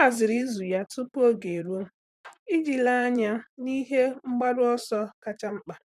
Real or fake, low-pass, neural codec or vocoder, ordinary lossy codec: fake; 14.4 kHz; vocoder, 44.1 kHz, 128 mel bands, Pupu-Vocoder; none